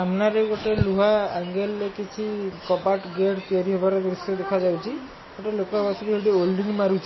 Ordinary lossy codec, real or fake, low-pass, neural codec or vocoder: MP3, 24 kbps; real; 7.2 kHz; none